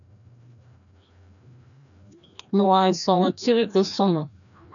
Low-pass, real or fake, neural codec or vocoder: 7.2 kHz; fake; codec, 16 kHz, 1 kbps, FreqCodec, larger model